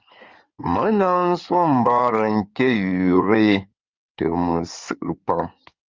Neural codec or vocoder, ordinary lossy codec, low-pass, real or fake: codec, 16 kHz in and 24 kHz out, 2.2 kbps, FireRedTTS-2 codec; Opus, 32 kbps; 7.2 kHz; fake